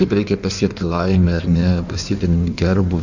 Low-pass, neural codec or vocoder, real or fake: 7.2 kHz; codec, 16 kHz in and 24 kHz out, 1.1 kbps, FireRedTTS-2 codec; fake